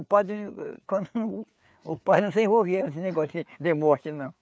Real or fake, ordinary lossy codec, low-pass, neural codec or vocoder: fake; none; none; codec, 16 kHz, 4 kbps, FreqCodec, larger model